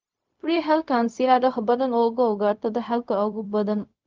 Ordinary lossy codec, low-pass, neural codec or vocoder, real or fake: Opus, 24 kbps; 7.2 kHz; codec, 16 kHz, 0.4 kbps, LongCat-Audio-Codec; fake